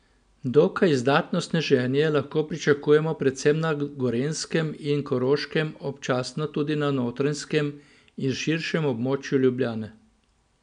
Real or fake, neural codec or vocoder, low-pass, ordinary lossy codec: real; none; 9.9 kHz; none